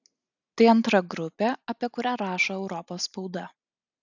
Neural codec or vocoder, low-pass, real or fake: none; 7.2 kHz; real